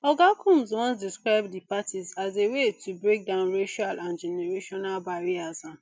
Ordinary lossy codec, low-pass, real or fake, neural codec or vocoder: none; none; real; none